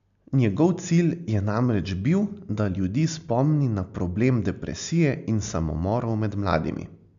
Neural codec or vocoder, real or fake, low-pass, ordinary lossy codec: none; real; 7.2 kHz; MP3, 64 kbps